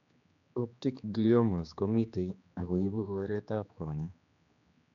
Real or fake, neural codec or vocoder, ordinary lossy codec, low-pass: fake; codec, 16 kHz, 2 kbps, X-Codec, HuBERT features, trained on general audio; none; 7.2 kHz